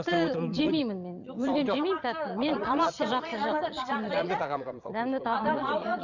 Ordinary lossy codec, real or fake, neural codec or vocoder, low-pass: none; fake; vocoder, 22.05 kHz, 80 mel bands, Vocos; 7.2 kHz